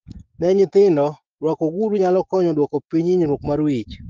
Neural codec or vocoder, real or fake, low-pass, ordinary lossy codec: codec, 16 kHz, 6 kbps, DAC; fake; 7.2 kHz; Opus, 32 kbps